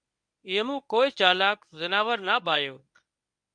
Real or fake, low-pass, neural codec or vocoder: fake; 9.9 kHz; codec, 24 kHz, 0.9 kbps, WavTokenizer, medium speech release version 1